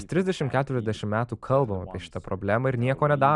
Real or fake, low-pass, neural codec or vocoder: real; 10.8 kHz; none